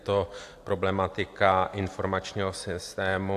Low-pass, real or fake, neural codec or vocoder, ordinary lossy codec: 14.4 kHz; real; none; AAC, 64 kbps